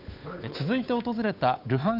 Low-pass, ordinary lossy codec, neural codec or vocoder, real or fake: 5.4 kHz; none; codec, 16 kHz, 8 kbps, FunCodec, trained on Chinese and English, 25 frames a second; fake